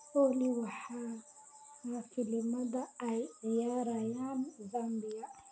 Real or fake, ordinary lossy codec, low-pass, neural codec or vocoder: real; none; none; none